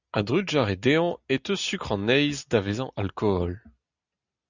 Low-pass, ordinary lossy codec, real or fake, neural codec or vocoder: 7.2 kHz; Opus, 64 kbps; real; none